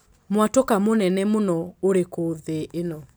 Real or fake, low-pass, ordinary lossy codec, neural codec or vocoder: real; none; none; none